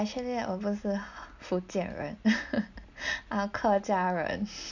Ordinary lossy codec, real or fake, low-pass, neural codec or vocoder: none; real; 7.2 kHz; none